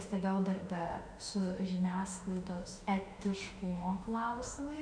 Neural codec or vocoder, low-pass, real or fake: autoencoder, 48 kHz, 32 numbers a frame, DAC-VAE, trained on Japanese speech; 9.9 kHz; fake